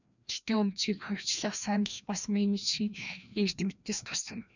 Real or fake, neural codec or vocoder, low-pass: fake; codec, 16 kHz, 1 kbps, FreqCodec, larger model; 7.2 kHz